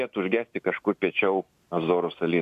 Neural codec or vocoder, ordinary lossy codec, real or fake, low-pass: none; MP3, 96 kbps; real; 14.4 kHz